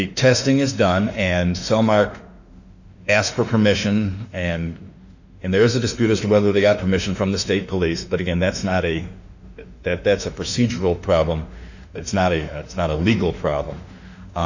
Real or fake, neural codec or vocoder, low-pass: fake; autoencoder, 48 kHz, 32 numbers a frame, DAC-VAE, trained on Japanese speech; 7.2 kHz